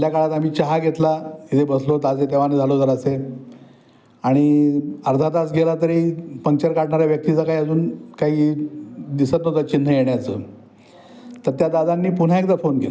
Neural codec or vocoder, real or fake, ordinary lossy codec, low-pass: none; real; none; none